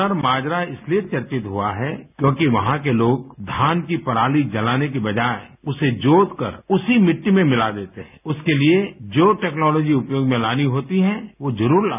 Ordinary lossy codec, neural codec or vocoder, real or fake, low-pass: none; none; real; 3.6 kHz